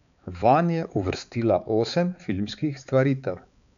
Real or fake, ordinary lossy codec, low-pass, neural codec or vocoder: fake; none; 7.2 kHz; codec, 16 kHz, 4 kbps, X-Codec, HuBERT features, trained on balanced general audio